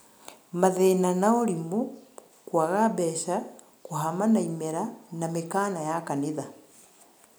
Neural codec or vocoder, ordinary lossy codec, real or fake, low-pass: none; none; real; none